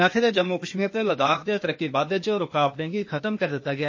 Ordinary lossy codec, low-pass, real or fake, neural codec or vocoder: MP3, 32 kbps; 7.2 kHz; fake; codec, 16 kHz, 0.8 kbps, ZipCodec